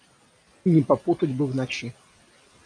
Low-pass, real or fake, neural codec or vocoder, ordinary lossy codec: 9.9 kHz; real; none; AAC, 48 kbps